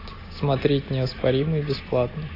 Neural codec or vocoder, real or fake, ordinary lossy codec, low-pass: none; real; AAC, 24 kbps; 5.4 kHz